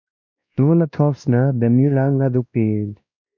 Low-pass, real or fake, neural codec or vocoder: 7.2 kHz; fake; codec, 16 kHz, 1 kbps, X-Codec, WavLM features, trained on Multilingual LibriSpeech